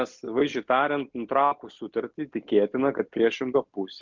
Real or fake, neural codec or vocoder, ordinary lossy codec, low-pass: real; none; MP3, 64 kbps; 7.2 kHz